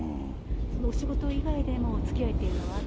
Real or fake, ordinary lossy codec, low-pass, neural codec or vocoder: real; none; none; none